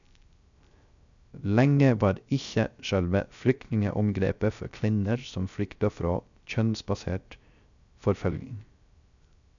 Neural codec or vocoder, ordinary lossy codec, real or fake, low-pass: codec, 16 kHz, 0.3 kbps, FocalCodec; none; fake; 7.2 kHz